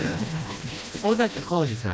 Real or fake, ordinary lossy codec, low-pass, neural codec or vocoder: fake; none; none; codec, 16 kHz, 1 kbps, FreqCodec, smaller model